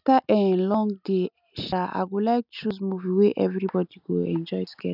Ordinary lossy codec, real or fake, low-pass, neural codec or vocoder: none; real; 5.4 kHz; none